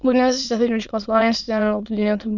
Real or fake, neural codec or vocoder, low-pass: fake; autoencoder, 22.05 kHz, a latent of 192 numbers a frame, VITS, trained on many speakers; 7.2 kHz